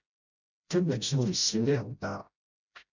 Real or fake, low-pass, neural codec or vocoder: fake; 7.2 kHz; codec, 16 kHz, 0.5 kbps, FreqCodec, smaller model